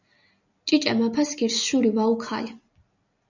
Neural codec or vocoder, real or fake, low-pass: none; real; 7.2 kHz